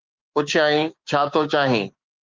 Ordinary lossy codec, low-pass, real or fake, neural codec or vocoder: Opus, 32 kbps; 7.2 kHz; fake; codec, 16 kHz, 6 kbps, DAC